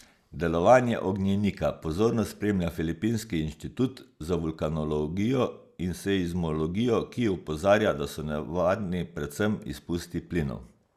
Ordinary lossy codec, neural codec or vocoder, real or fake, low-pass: Opus, 64 kbps; none; real; 14.4 kHz